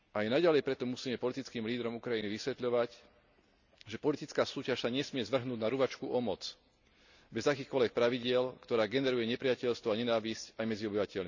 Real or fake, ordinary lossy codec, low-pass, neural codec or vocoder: real; none; 7.2 kHz; none